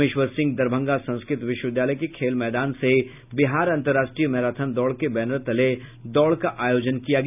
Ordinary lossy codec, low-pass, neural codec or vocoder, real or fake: none; 3.6 kHz; none; real